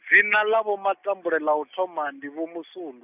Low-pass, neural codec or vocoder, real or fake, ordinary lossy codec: 3.6 kHz; none; real; none